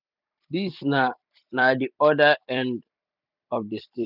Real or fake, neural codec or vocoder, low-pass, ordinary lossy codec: fake; vocoder, 44.1 kHz, 128 mel bands every 256 samples, BigVGAN v2; 5.4 kHz; none